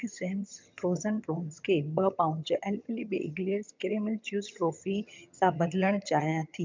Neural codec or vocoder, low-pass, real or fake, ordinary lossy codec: vocoder, 22.05 kHz, 80 mel bands, HiFi-GAN; 7.2 kHz; fake; MP3, 64 kbps